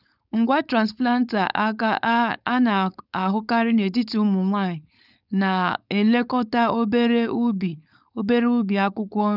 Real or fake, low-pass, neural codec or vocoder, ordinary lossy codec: fake; 5.4 kHz; codec, 16 kHz, 4.8 kbps, FACodec; none